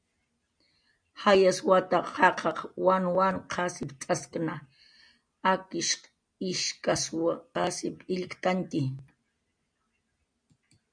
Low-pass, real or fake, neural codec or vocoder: 9.9 kHz; real; none